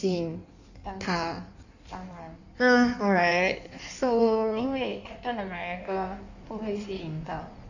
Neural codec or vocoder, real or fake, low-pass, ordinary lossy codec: codec, 16 kHz in and 24 kHz out, 1.1 kbps, FireRedTTS-2 codec; fake; 7.2 kHz; none